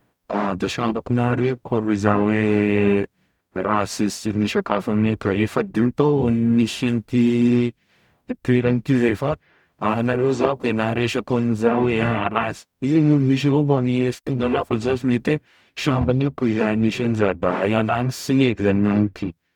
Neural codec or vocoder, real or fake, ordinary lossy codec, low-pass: codec, 44.1 kHz, 0.9 kbps, DAC; fake; none; 19.8 kHz